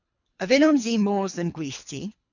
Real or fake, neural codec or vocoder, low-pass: fake; codec, 24 kHz, 3 kbps, HILCodec; 7.2 kHz